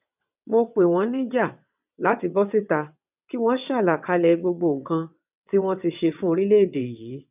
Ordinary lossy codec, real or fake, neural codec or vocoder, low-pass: none; fake; vocoder, 22.05 kHz, 80 mel bands, WaveNeXt; 3.6 kHz